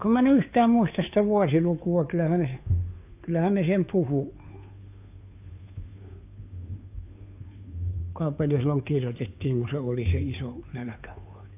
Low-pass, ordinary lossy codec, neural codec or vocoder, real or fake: 3.6 kHz; AAC, 32 kbps; codec, 16 kHz, 2 kbps, FunCodec, trained on Chinese and English, 25 frames a second; fake